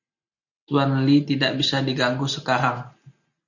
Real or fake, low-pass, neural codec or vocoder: real; 7.2 kHz; none